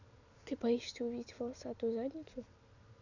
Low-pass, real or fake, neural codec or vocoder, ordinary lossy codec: 7.2 kHz; real; none; none